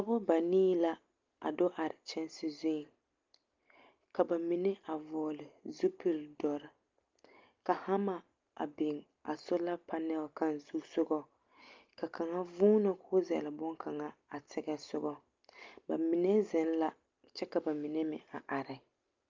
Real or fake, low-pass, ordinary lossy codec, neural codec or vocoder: real; 7.2 kHz; Opus, 24 kbps; none